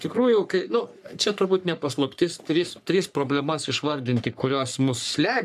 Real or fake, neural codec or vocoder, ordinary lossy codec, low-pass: fake; codec, 44.1 kHz, 3.4 kbps, Pupu-Codec; AAC, 96 kbps; 14.4 kHz